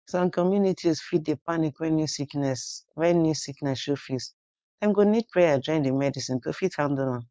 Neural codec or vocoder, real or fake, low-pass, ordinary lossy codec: codec, 16 kHz, 4.8 kbps, FACodec; fake; none; none